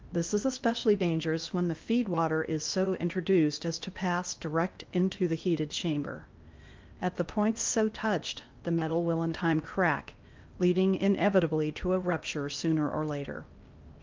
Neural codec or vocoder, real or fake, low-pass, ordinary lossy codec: codec, 16 kHz in and 24 kHz out, 0.8 kbps, FocalCodec, streaming, 65536 codes; fake; 7.2 kHz; Opus, 24 kbps